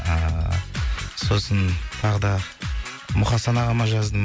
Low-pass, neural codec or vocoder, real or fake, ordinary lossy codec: none; none; real; none